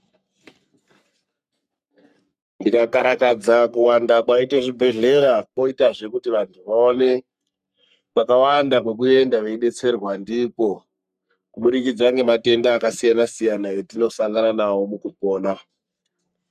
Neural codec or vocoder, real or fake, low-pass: codec, 44.1 kHz, 3.4 kbps, Pupu-Codec; fake; 14.4 kHz